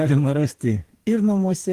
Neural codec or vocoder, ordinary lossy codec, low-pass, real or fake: codec, 44.1 kHz, 2.6 kbps, DAC; Opus, 24 kbps; 14.4 kHz; fake